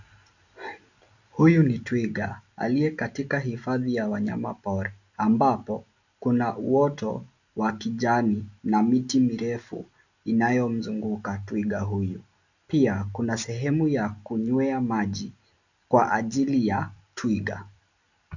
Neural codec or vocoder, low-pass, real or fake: none; 7.2 kHz; real